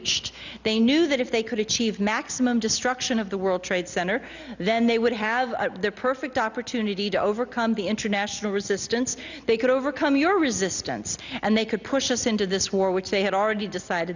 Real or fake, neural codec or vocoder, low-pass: real; none; 7.2 kHz